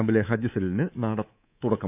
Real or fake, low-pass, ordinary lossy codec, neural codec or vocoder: fake; 3.6 kHz; AAC, 32 kbps; codec, 24 kHz, 3.1 kbps, DualCodec